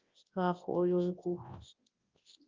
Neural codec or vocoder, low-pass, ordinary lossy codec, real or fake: codec, 24 kHz, 0.9 kbps, WavTokenizer, large speech release; 7.2 kHz; Opus, 32 kbps; fake